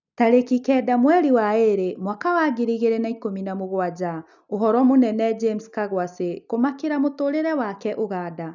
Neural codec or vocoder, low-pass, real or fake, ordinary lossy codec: none; 7.2 kHz; real; none